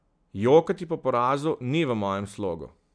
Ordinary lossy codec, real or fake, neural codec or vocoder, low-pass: none; real; none; 9.9 kHz